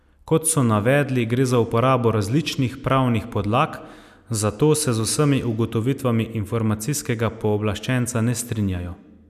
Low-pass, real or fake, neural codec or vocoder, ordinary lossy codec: 14.4 kHz; real; none; none